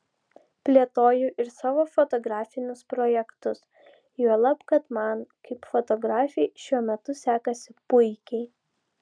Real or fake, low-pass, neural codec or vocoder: real; 9.9 kHz; none